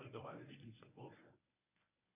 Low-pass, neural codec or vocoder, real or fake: 3.6 kHz; codec, 24 kHz, 0.9 kbps, WavTokenizer, medium speech release version 1; fake